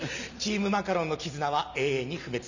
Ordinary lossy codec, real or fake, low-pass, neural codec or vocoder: none; real; 7.2 kHz; none